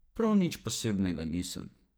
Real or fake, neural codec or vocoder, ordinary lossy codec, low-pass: fake; codec, 44.1 kHz, 2.6 kbps, SNAC; none; none